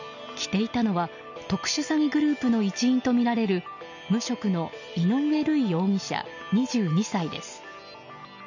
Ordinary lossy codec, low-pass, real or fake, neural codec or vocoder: none; 7.2 kHz; real; none